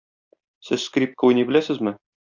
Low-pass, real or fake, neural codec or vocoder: 7.2 kHz; real; none